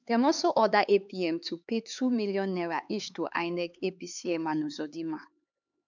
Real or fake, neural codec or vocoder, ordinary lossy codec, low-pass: fake; codec, 16 kHz, 4 kbps, X-Codec, HuBERT features, trained on LibriSpeech; none; 7.2 kHz